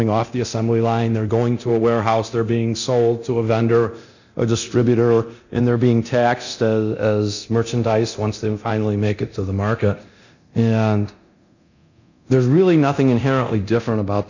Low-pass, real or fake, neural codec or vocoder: 7.2 kHz; fake; codec, 24 kHz, 0.9 kbps, DualCodec